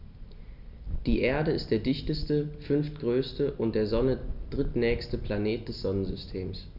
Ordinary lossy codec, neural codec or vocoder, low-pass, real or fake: none; none; 5.4 kHz; real